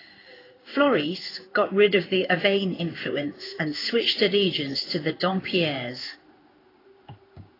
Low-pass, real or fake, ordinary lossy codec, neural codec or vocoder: 5.4 kHz; fake; AAC, 24 kbps; codec, 16 kHz in and 24 kHz out, 1 kbps, XY-Tokenizer